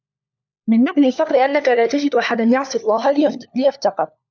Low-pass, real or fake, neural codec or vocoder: 7.2 kHz; fake; codec, 16 kHz, 4 kbps, FunCodec, trained on LibriTTS, 50 frames a second